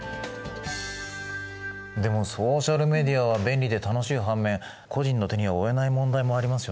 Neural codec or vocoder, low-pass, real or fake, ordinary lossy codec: none; none; real; none